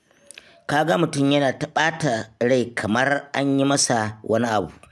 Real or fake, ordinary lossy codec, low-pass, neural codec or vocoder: real; none; none; none